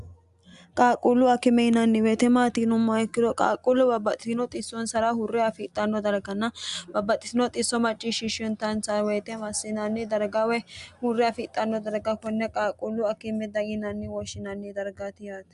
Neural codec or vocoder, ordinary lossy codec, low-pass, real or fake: none; Opus, 64 kbps; 10.8 kHz; real